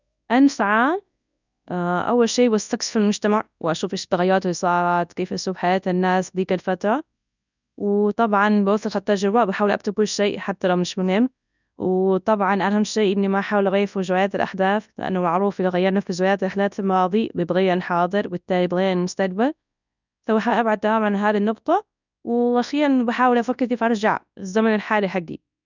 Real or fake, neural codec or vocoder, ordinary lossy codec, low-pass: fake; codec, 24 kHz, 0.9 kbps, WavTokenizer, large speech release; none; 7.2 kHz